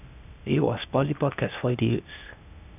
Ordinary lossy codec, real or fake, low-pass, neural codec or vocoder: none; fake; 3.6 kHz; codec, 16 kHz, 0.8 kbps, ZipCodec